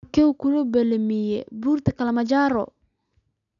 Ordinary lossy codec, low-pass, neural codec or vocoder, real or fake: none; 7.2 kHz; none; real